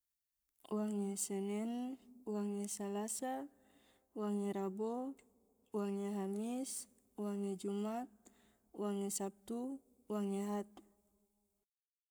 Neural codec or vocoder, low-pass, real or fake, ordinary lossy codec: codec, 44.1 kHz, 7.8 kbps, Pupu-Codec; none; fake; none